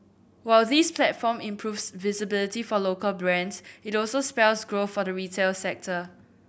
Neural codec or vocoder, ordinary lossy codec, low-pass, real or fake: none; none; none; real